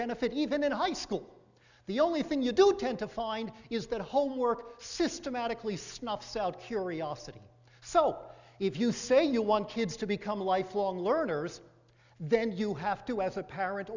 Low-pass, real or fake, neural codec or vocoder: 7.2 kHz; real; none